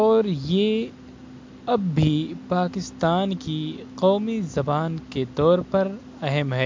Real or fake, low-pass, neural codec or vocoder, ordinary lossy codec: real; 7.2 kHz; none; MP3, 48 kbps